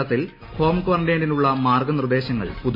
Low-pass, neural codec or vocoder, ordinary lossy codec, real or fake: 5.4 kHz; none; MP3, 24 kbps; real